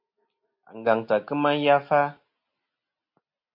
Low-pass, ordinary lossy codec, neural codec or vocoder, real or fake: 5.4 kHz; MP3, 32 kbps; none; real